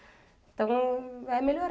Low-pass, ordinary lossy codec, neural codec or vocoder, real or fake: none; none; none; real